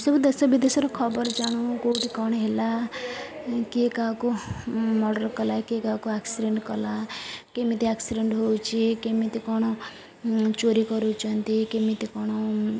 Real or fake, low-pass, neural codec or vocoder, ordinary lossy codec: real; none; none; none